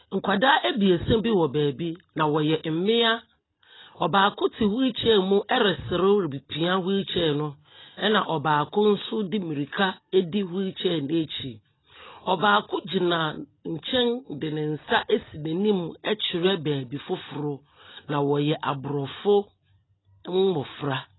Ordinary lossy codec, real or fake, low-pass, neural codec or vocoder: AAC, 16 kbps; real; 7.2 kHz; none